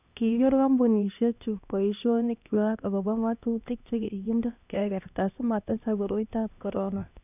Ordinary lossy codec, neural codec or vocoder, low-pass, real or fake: none; codec, 16 kHz, 1 kbps, X-Codec, HuBERT features, trained on LibriSpeech; 3.6 kHz; fake